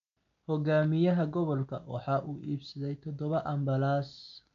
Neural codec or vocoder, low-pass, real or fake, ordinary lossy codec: none; 7.2 kHz; real; none